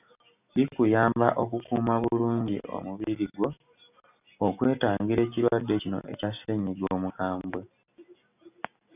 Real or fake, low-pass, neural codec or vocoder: real; 3.6 kHz; none